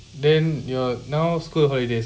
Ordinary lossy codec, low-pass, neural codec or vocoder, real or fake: none; none; none; real